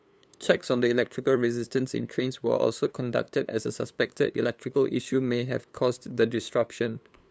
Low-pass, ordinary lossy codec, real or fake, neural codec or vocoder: none; none; fake; codec, 16 kHz, 2 kbps, FunCodec, trained on LibriTTS, 25 frames a second